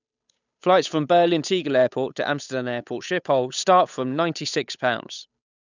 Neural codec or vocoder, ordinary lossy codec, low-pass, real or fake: codec, 16 kHz, 8 kbps, FunCodec, trained on Chinese and English, 25 frames a second; none; 7.2 kHz; fake